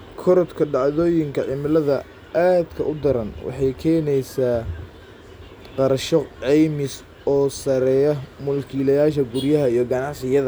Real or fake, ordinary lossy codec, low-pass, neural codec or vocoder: real; none; none; none